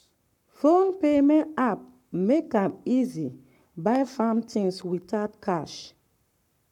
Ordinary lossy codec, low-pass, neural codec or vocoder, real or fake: none; 19.8 kHz; codec, 44.1 kHz, 7.8 kbps, Pupu-Codec; fake